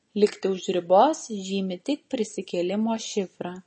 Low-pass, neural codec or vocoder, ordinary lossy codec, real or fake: 9.9 kHz; none; MP3, 32 kbps; real